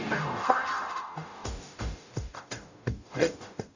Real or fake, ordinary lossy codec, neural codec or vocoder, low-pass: fake; none; codec, 44.1 kHz, 0.9 kbps, DAC; 7.2 kHz